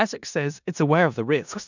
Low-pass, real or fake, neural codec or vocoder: 7.2 kHz; fake; codec, 16 kHz in and 24 kHz out, 0.4 kbps, LongCat-Audio-Codec, four codebook decoder